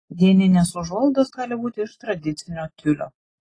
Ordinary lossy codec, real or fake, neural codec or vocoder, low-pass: AAC, 32 kbps; real; none; 9.9 kHz